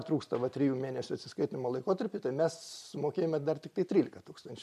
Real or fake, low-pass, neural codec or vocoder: real; 14.4 kHz; none